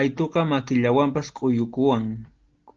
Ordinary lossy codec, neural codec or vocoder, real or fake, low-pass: Opus, 16 kbps; none; real; 7.2 kHz